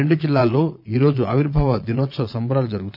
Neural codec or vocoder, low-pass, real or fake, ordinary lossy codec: vocoder, 22.05 kHz, 80 mel bands, Vocos; 5.4 kHz; fake; none